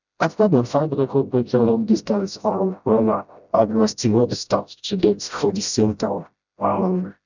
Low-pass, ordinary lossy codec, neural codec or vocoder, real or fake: 7.2 kHz; none; codec, 16 kHz, 0.5 kbps, FreqCodec, smaller model; fake